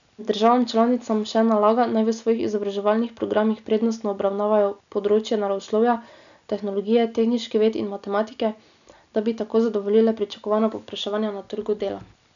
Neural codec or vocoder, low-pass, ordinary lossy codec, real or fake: none; 7.2 kHz; none; real